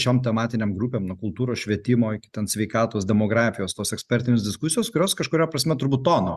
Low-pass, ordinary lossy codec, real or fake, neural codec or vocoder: 14.4 kHz; Opus, 64 kbps; real; none